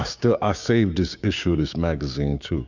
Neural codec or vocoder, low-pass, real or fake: codec, 16 kHz, 6 kbps, DAC; 7.2 kHz; fake